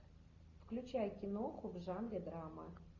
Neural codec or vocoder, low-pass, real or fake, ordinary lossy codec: none; 7.2 kHz; real; MP3, 64 kbps